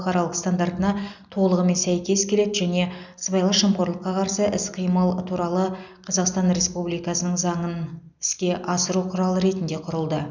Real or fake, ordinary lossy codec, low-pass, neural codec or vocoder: real; none; 7.2 kHz; none